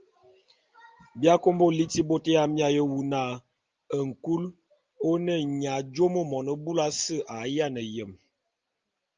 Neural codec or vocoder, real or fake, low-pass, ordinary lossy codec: none; real; 7.2 kHz; Opus, 24 kbps